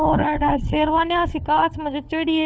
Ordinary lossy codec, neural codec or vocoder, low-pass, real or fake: none; codec, 16 kHz, 4 kbps, FunCodec, trained on LibriTTS, 50 frames a second; none; fake